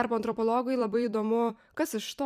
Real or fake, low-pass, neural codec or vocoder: fake; 14.4 kHz; vocoder, 44.1 kHz, 128 mel bands every 256 samples, BigVGAN v2